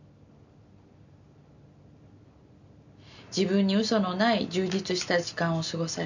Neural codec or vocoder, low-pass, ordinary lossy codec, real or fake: none; 7.2 kHz; none; real